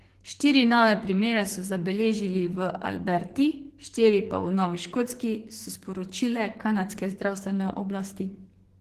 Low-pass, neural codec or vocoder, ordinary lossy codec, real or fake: 14.4 kHz; codec, 44.1 kHz, 2.6 kbps, SNAC; Opus, 16 kbps; fake